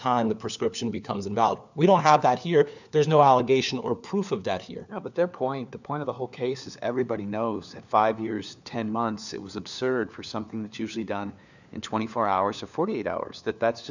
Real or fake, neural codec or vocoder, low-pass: fake; codec, 16 kHz, 4 kbps, FunCodec, trained on LibriTTS, 50 frames a second; 7.2 kHz